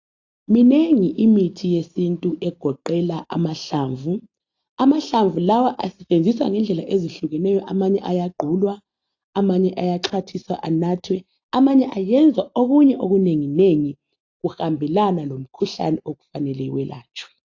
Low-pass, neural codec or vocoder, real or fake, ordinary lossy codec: 7.2 kHz; none; real; AAC, 48 kbps